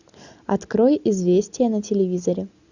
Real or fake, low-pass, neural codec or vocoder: real; 7.2 kHz; none